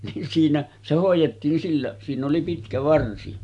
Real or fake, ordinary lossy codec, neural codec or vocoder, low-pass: real; none; none; 10.8 kHz